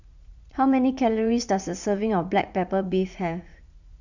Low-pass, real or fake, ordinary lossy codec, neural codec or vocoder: 7.2 kHz; fake; none; vocoder, 44.1 kHz, 80 mel bands, Vocos